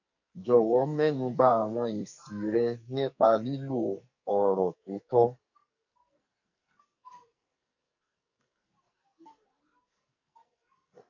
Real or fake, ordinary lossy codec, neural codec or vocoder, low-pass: fake; none; codec, 44.1 kHz, 2.6 kbps, SNAC; 7.2 kHz